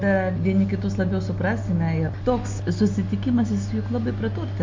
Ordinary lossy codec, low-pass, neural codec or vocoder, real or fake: MP3, 48 kbps; 7.2 kHz; none; real